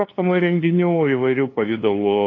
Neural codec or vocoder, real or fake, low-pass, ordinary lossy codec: codec, 16 kHz, 2 kbps, FunCodec, trained on LibriTTS, 25 frames a second; fake; 7.2 kHz; AAC, 32 kbps